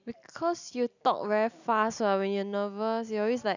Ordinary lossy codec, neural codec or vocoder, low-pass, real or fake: none; none; 7.2 kHz; real